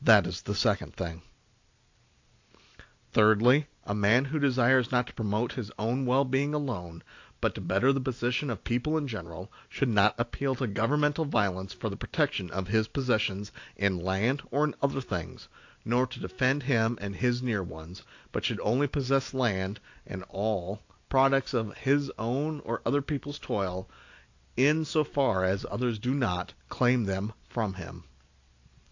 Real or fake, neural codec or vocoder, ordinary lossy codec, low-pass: real; none; AAC, 48 kbps; 7.2 kHz